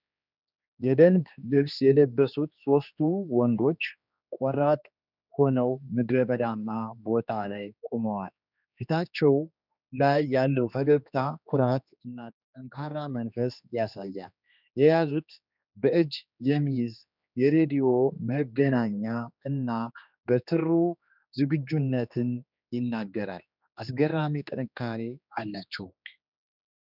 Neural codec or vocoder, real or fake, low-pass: codec, 16 kHz, 2 kbps, X-Codec, HuBERT features, trained on general audio; fake; 5.4 kHz